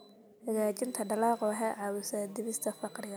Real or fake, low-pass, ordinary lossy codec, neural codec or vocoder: real; none; none; none